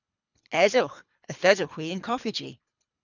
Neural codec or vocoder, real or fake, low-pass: codec, 24 kHz, 3 kbps, HILCodec; fake; 7.2 kHz